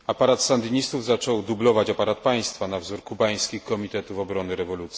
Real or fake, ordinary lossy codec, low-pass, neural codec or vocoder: real; none; none; none